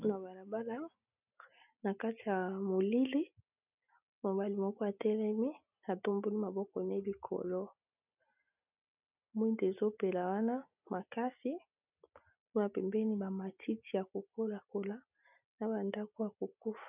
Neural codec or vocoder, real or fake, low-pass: none; real; 3.6 kHz